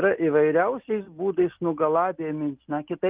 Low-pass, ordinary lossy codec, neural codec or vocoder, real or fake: 3.6 kHz; Opus, 24 kbps; none; real